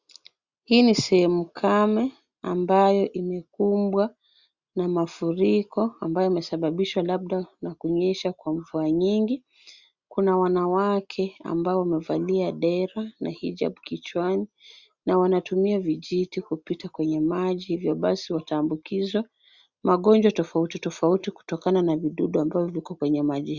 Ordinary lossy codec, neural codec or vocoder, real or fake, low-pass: Opus, 64 kbps; none; real; 7.2 kHz